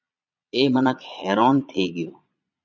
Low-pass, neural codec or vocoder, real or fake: 7.2 kHz; vocoder, 22.05 kHz, 80 mel bands, Vocos; fake